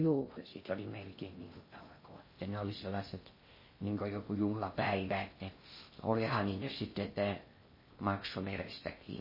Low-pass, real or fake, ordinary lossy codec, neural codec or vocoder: 5.4 kHz; fake; MP3, 24 kbps; codec, 16 kHz in and 24 kHz out, 0.6 kbps, FocalCodec, streaming, 2048 codes